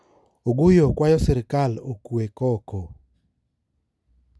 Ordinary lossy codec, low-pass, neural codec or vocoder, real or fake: none; none; none; real